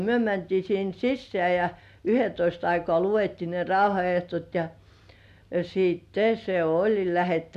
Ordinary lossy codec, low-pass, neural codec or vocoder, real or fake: none; 14.4 kHz; none; real